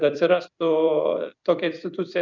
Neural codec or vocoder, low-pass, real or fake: vocoder, 44.1 kHz, 128 mel bands every 256 samples, BigVGAN v2; 7.2 kHz; fake